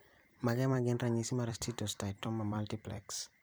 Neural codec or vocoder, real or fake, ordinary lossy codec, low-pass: vocoder, 44.1 kHz, 128 mel bands every 256 samples, BigVGAN v2; fake; none; none